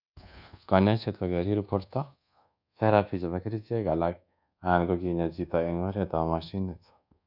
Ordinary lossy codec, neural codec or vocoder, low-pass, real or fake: none; codec, 24 kHz, 1.2 kbps, DualCodec; 5.4 kHz; fake